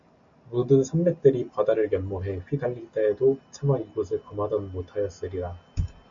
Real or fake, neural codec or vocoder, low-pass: real; none; 7.2 kHz